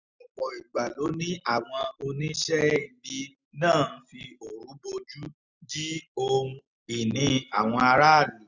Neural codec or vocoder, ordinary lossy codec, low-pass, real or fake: none; none; 7.2 kHz; real